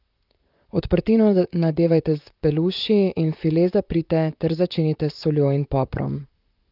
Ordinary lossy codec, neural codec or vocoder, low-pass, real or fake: Opus, 24 kbps; vocoder, 44.1 kHz, 128 mel bands, Pupu-Vocoder; 5.4 kHz; fake